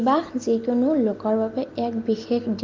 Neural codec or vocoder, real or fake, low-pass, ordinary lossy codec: none; real; 7.2 kHz; Opus, 32 kbps